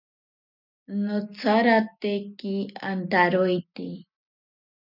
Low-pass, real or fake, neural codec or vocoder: 5.4 kHz; real; none